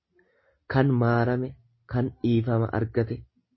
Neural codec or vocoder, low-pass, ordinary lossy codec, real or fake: none; 7.2 kHz; MP3, 24 kbps; real